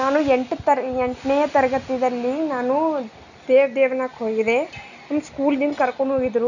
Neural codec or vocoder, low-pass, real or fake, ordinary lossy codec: none; 7.2 kHz; real; none